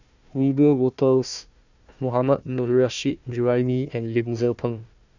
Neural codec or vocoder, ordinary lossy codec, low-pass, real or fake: codec, 16 kHz, 1 kbps, FunCodec, trained on Chinese and English, 50 frames a second; none; 7.2 kHz; fake